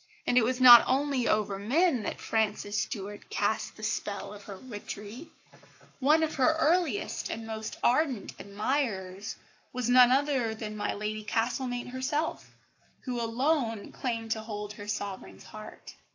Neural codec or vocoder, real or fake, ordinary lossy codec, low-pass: codec, 44.1 kHz, 7.8 kbps, Pupu-Codec; fake; AAC, 48 kbps; 7.2 kHz